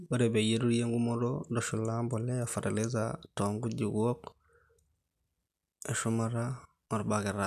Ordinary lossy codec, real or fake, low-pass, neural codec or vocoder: none; real; 14.4 kHz; none